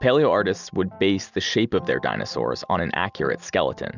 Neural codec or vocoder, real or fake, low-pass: none; real; 7.2 kHz